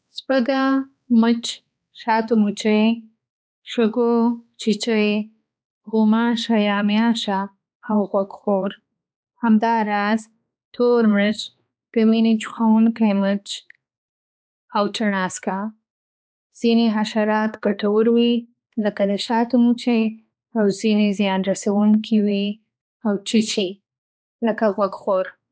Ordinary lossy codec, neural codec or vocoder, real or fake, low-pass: none; codec, 16 kHz, 2 kbps, X-Codec, HuBERT features, trained on balanced general audio; fake; none